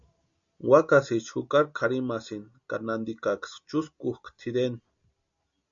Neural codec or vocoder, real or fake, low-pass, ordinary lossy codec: none; real; 7.2 kHz; MP3, 64 kbps